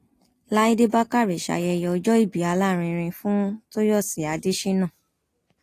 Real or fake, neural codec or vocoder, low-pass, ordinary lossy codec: real; none; 14.4 kHz; AAC, 48 kbps